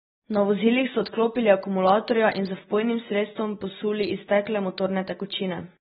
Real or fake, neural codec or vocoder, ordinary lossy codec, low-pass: real; none; AAC, 16 kbps; 10.8 kHz